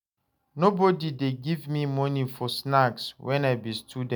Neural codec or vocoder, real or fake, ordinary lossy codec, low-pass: none; real; none; none